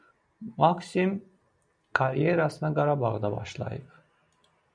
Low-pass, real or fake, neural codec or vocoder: 9.9 kHz; real; none